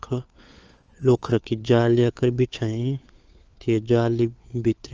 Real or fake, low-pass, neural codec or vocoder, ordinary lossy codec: fake; 7.2 kHz; codec, 16 kHz, 4 kbps, FunCodec, trained on LibriTTS, 50 frames a second; Opus, 24 kbps